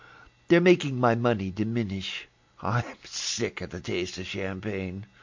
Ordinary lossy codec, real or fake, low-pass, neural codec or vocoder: MP3, 48 kbps; real; 7.2 kHz; none